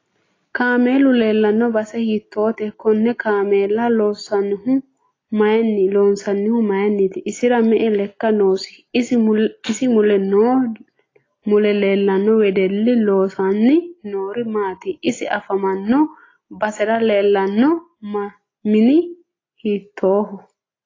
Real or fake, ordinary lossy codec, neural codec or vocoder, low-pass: real; AAC, 32 kbps; none; 7.2 kHz